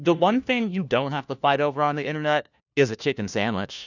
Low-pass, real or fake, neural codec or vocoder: 7.2 kHz; fake; codec, 16 kHz, 1 kbps, FunCodec, trained on LibriTTS, 50 frames a second